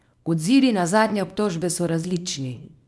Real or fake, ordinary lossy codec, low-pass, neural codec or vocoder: fake; none; none; codec, 24 kHz, 0.9 kbps, WavTokenizer, small release